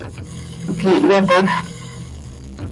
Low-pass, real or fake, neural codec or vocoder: 10.8 kHz; fake; codec, 44.1 kHz, 7.8 kbps, DAC